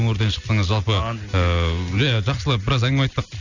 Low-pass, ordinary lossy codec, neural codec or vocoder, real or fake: 7.2 kHz; none; none; real